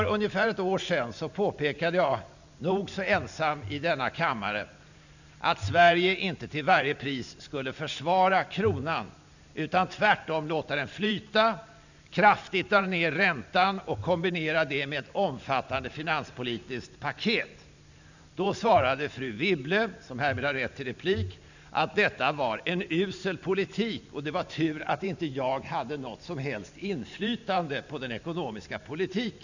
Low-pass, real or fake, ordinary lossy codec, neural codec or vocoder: 7.2 kHz; fake; none; vocoder, 44.1 kHz, 128 mel bands every 512 samples, BigVGAN v2